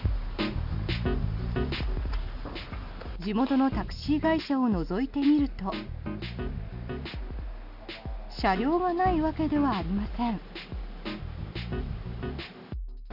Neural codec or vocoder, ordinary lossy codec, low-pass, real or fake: none; none; 5.4 kHz; real